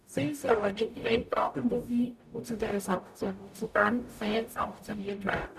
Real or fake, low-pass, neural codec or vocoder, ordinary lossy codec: fake; 14.4 kHz; codec, 44.1 kHz, 0.9 kbps, DAC; none